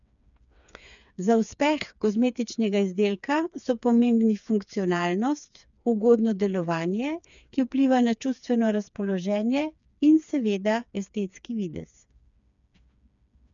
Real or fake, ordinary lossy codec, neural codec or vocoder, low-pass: fake; none; codec, 16 kHz, 4 kbps, FreqCodec, smaller model; 7.2 kHz